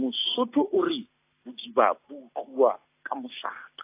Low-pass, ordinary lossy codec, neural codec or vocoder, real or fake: 3.6 kHz; AAC, 24 kbps; none; real